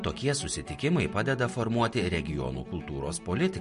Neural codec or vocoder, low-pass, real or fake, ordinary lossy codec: none; 10.8 kHz; real; MP3, 48 kbps